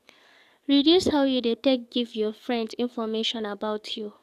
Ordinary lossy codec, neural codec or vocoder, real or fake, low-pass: none; codec, 44.1 kHz, 7.8 kbps, DAC; fake; 14.4 kHz